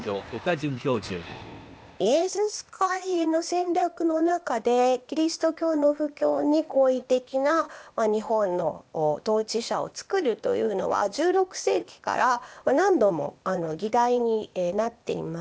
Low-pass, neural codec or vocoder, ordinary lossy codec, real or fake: none; codec, 16 kHz, 0.8 kbps, ZipCodec; none; fake